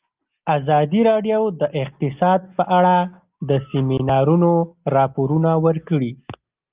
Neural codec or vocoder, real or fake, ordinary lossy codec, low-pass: none; real; Opus, 32 kbps; 3.6 kHz